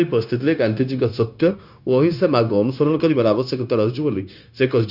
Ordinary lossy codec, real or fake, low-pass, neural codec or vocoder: none; fake; 5.4 kHz; codec, 16 kHz, 0.9 kbps, LongCat-Audio-Codec